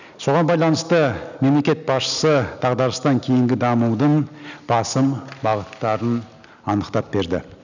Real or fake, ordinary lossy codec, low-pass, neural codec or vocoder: real; none; 7.2 kHz; none